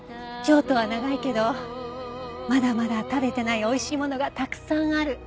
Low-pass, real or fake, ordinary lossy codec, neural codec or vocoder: none; real; none; none